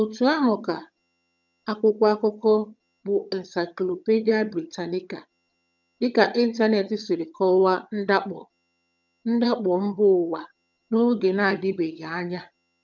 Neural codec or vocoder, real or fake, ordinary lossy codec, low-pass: vocoder, 22.05 kHz, 80 mel bands, HiFi-GAN; fake; none; 7.2 kHz